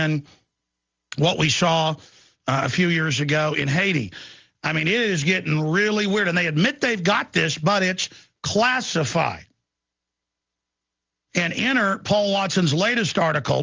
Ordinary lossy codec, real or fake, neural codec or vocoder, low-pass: Opus, 32 kbps; real; none; 7.2 kHz